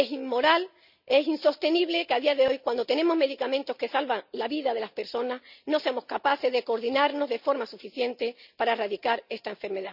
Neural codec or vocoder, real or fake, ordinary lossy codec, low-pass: none; real; none; 5.4 kHz